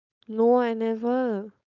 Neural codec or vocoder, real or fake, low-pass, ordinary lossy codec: codec, 16 kHz, 4.8 kbps, FACodec; fake; 7.2 kHz; none